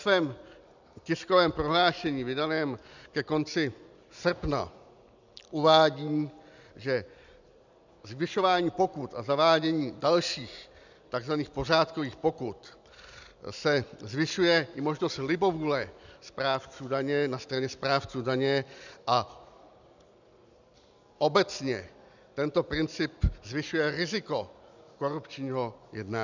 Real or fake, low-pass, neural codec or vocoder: real; 7.2 kHz; none